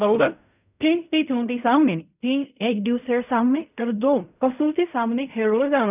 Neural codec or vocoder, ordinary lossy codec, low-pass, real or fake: codec, 16 kHz in and 24 kHz out, 0.4 kbps, LongCat-Audio-Codec, fine tuned four codebook decoder; none; 3.6 kHz; fake